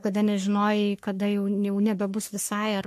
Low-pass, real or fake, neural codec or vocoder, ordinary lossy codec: 14.4 kHz; fake; codec, 44.1 kHz, 3.4 kbps, Pupu-Codec; MP3, 64 kbps